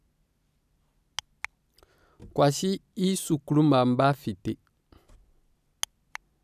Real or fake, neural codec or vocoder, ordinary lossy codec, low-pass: fake; vocoder, 44.1 kHz, 128 mel bands every 256 samples, BigVGAN v2; none; 14.4 kHz